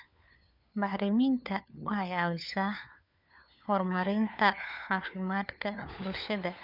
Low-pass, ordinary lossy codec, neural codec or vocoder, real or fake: 5.4 kHz; none; codec, 16 kHz, 4 kbps, FunCodec, trained on LibriTTS, 50 frames a second; fake